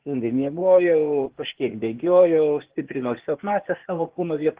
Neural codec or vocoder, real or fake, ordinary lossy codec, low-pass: codec, 16 kHz, 0.8 kbps, ZipCodec; fake; Opus, 16 kbps; 3.6 kHz